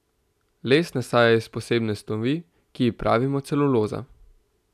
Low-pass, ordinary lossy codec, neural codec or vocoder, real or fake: 14.4 kHz; none; none; real